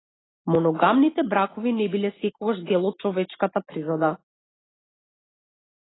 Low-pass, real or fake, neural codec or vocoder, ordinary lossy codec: 7.2 kHz; real; none; AAC, 16 kbps